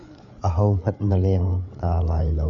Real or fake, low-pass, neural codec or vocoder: fake; 7.2 kHz; codec, 16 kHz, 8 kbps, FreqCodec, larger model